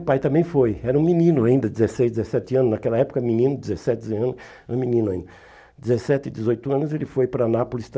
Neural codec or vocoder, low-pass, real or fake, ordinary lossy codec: none; none; real; none